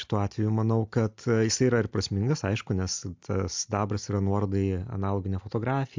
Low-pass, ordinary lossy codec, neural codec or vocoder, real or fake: 7.2 kHz; MP3, 64 kbps; none; real